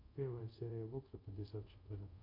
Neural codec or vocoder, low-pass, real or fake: codec, 24 kHz, 0.5 kbps, DualCodec; 5.4 kHz; fake